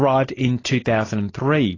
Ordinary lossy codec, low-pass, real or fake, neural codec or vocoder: AAC, 32 kbps; 7.2 kHz; real; none